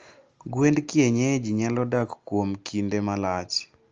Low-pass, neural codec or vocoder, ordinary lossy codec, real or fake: 7.2 kHz; none; Opus, 24 kbps; real